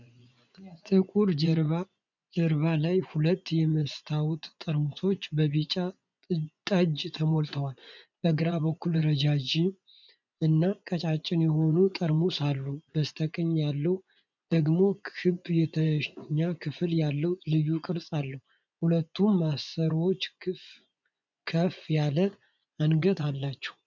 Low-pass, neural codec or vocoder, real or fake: 7.2 kHz; vocoder, 24 kHz, 100 mel bands, Vocos; fake